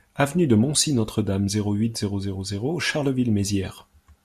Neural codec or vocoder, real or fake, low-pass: none; real; 14.4 kHz